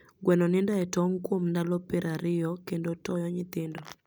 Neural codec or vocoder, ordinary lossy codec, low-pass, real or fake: none; none; none; real